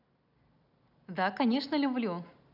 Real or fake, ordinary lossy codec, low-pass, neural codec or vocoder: real; none; 5.4 kHz; none